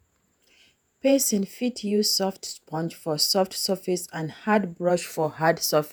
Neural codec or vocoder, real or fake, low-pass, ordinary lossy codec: vocoder, 48 kHz, 128 mel bands, Vocos; fake; none; none